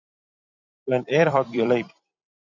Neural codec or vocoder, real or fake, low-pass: vocoder, 44.1 kHz, 128 mel bands every 256 samples, BigVGAN v2; fake; 7.2 kHz